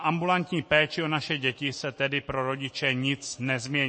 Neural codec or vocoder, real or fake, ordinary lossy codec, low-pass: autoencoder, 48 kHz, 128 numbers a frame, DAC-VAE, trained on Japanese speech; fake; MP3, 32 kbps; 9.9 kHz